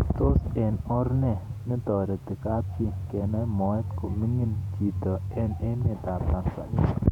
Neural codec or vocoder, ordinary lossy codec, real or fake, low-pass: vocoder, 48 kHz, 128 mel bands, Vocos; none; fake; 19.8 kHz